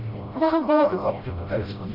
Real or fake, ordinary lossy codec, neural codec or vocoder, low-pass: fake; Opus, 64 kbps; codec, 16 kHz, 0.5 kbps, FreqCodec, smaller model; 5.4 kHz